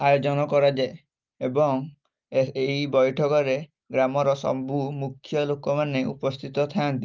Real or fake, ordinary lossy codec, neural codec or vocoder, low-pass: real; Opus, 24 kbps; none; 7.2 kHz